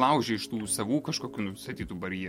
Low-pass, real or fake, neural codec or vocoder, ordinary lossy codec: 19.8 kHz; real; none; MP3, 64 kbps